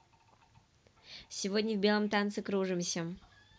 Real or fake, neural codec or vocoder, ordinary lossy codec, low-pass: real; none; none; none